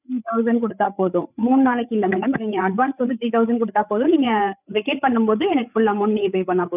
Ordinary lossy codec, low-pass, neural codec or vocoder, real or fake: none; 3.6 kHz; codec, 16 kHz, 8 kbps, FreqCodec, larger model; fake